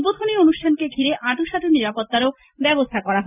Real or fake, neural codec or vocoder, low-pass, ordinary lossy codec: real; none; 3.6 kHz; none